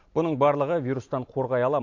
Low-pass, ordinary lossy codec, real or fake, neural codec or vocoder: 7.2 kHz; AAC, 48 kbps; real; none